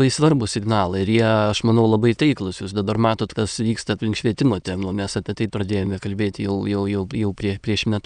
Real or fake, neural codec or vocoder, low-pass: fake; autoencoder, 22.05 kHz, a latent of 192 numbers a frame, VITS, trained on many speakers; 9.9 kHz